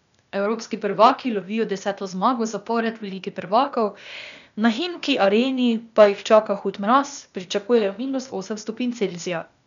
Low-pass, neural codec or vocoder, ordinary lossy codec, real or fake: 7.2 kHz; codec, 16 kHz, 0.8 kbps, ZipCodec; none; fake